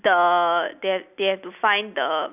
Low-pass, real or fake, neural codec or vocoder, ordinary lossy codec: 3.6 kHz; real; none; none